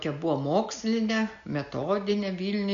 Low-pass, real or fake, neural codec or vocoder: 7.2 kHz; real; none